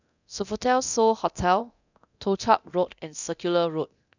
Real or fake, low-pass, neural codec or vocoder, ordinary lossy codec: fake; 7.2 kHz; codec, 24 kHz, 0.9 kbps, DualCodec; none